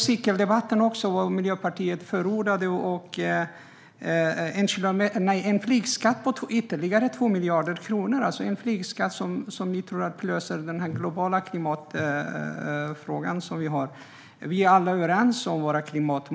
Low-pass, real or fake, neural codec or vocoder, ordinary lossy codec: none; real; none; none